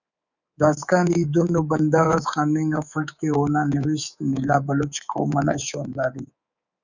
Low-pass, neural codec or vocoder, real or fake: 7.2 kHz; codec, 24 kHz, 3.1 kbps, DualCodec; fake